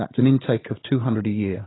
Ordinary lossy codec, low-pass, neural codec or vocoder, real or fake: AAC, 16 kbps; 7.2 kHz; codec, 16 kHz, 16 kbps, FunCodec, trained on Chinese and English, 50 frames a second; fake